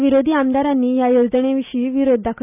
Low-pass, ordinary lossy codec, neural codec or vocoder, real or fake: 3.6 kHz; none; none; real